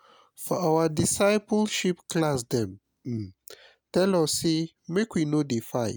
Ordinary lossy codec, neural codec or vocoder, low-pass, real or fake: none; none; none; real